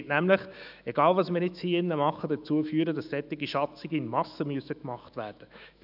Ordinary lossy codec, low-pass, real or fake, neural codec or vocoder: none; 5.4 kHz; fake; autoencoder, 48 kHz, 128 numbers a frame, DAC-VAE, trained on Japanese speech